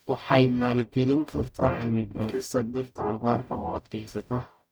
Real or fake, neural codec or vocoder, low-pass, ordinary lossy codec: fake; codec, 44.1 kHz, 0.9 kbps, DAC; none; none